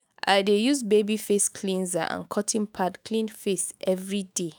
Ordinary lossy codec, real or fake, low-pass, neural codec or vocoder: none; fake; none; autoencoder, 48 kHz, 128 numbers a frame, DAC-VAE, trained on Japanese speech